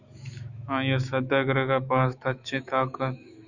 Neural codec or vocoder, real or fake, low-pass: autoencoder, 48 kHz, 128 numbers a frame, DAC-VAE, trained on Japanese speech; fake; 7.2 kHz